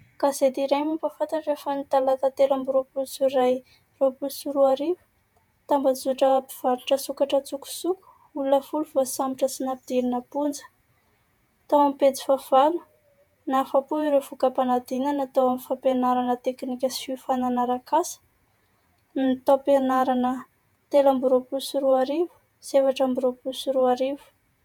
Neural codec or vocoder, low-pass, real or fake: vocoder, 48 kHz, 128 mel bands, Vocos; 19.8 kHz; fake